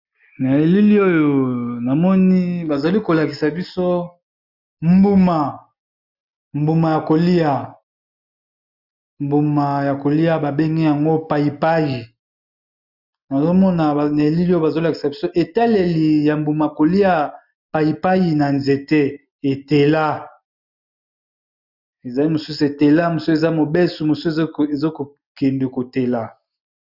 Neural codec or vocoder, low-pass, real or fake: none; 5.4 kHz; real